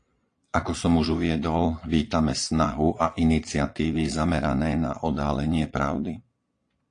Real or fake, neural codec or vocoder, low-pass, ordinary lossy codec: fake; vocoder, 22.05 kHz, 80 mel bands, Vocos; 9.9 kHz; AAC, 48 kbps